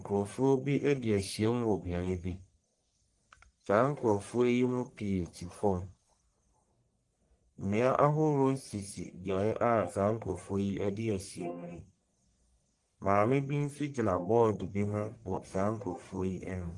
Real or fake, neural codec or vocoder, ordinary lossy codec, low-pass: fake; codec, 44.1 kHz, 1.7 kbps, Pupu-Codec; Opus, 24 kbps; 10.8 kHz